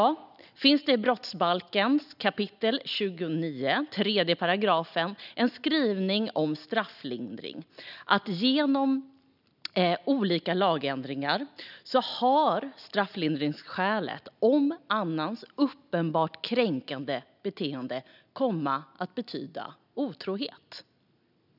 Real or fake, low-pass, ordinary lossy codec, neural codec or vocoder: real; 5.4 kHz; none; none